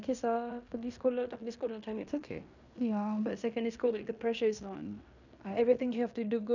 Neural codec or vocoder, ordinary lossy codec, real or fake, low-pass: codec, 16 kHz in and 24 kHz out, 0.9 kbps, LongCat-Audio-Codec, fine tuned four codebook decoder; none; fake; 7.2 kHz